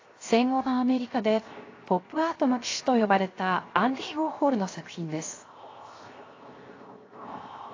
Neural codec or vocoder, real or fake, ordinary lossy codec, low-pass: codec, 16 kHz, 0.7 kbps, FocalCodec; fake; AAC, 32 kbps; 7.2 kHz